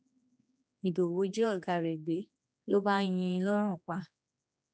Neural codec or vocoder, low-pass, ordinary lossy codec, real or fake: codec, 16 kHz, 2 kbps, X-Codec, HuBERT features, trained on general audio; none; none; fake